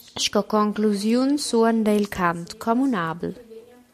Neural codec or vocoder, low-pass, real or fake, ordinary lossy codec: none; 14.4 kHz; real; MP3, 64 kbps